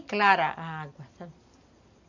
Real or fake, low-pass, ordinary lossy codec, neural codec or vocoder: real; 7.2 kHz; none; none